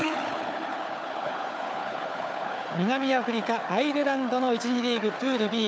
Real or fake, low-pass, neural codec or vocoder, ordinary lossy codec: fake; none; codec, 16 kHz, 4 kbps, FunCodec, trained on Chinese and English, 50 frames a second; none